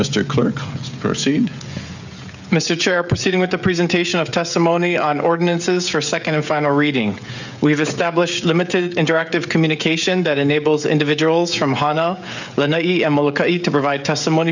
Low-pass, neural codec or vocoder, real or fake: 7.2 kHz; codec, 16 kHz, 16 kbps, FreqCodec, smaller model; fake